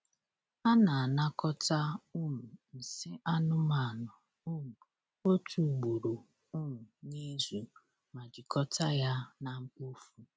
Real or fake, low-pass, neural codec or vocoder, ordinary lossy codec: real; none; none; none